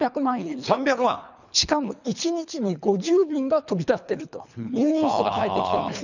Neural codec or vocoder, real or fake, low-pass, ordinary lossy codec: codec, 24 kHz, 3 kbps, HILCodec; fake; 7.2 kHz; none